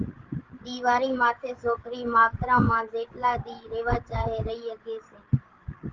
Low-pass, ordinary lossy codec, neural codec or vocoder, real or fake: 7.2 kHz; Opus, 16 kbps; codec, 16 kHz, 16 kbps, FreqCodec, larger model; fake